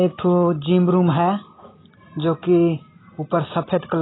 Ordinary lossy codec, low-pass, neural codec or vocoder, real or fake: AAC, 16 kbps; 7.2 kHz; none; real